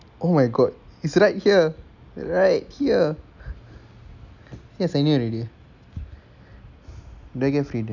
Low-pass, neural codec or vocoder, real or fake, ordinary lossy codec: 7.2 kHz; none; real; none